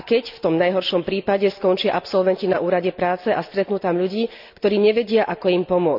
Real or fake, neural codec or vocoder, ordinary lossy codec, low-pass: real; none; none; 5.4 kHz